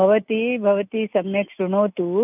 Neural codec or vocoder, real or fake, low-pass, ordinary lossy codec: none; real; 3.6 kHz; none